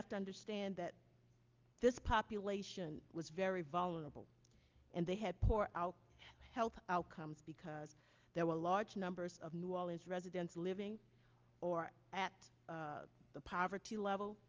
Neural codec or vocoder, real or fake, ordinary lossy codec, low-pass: none; real; Opus, 24 kbps; 7.2 kHz